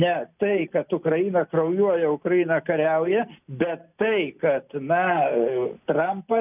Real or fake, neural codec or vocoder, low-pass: real; none; 3.6 kHz